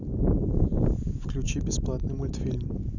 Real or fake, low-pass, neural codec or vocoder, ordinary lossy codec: real; 7.2 kHz; none; none